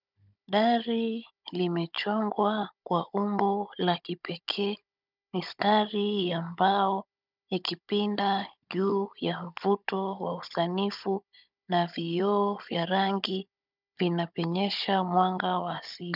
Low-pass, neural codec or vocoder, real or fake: 5.4 kHz; codec, 16 kHz, 16 kbps, FunCodec, trained on Chinese and English, 50 frames a second; fake